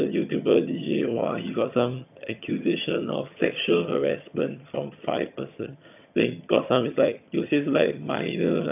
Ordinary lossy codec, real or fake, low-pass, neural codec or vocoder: none; fake; 3.6 kHz; vocoder, 22.05 kHz, 80 mel bands, HiFi-GAN